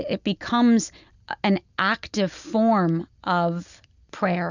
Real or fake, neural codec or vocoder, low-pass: real; none; 7.2 kHz